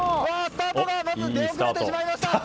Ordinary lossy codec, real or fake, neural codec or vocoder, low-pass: none; real; none; none